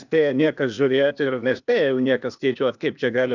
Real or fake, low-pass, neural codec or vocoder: fake; 7.2 kHz; codec, 16 kHz, 0.8 kbps, ZipCodec